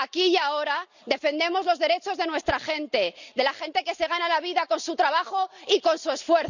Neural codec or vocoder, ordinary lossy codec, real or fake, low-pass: none; none; real; 7.2 kHz